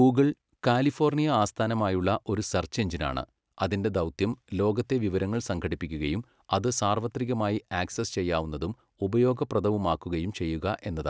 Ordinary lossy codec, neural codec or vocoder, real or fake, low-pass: none; none; real; none